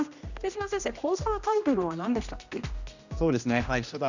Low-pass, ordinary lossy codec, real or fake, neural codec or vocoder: 7.2 kHz; none; fake; codec, 16 kHz, 1 kbps, X-Codec, HuBERT features, trained on general audio